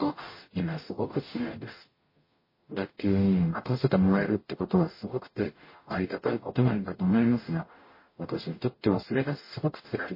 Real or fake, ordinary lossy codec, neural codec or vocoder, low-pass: fake; MP3, 24 kbps; codec, 44.1 kHz, 0.9 kbps, DAC; 5.4 kHz